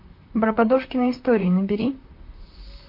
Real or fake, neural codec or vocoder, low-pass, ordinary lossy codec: fake; vocoder, 44.1 kHz, 128 mel bands, Pupu-Vocoder; 5.4 kHz; MP3, 32 kbps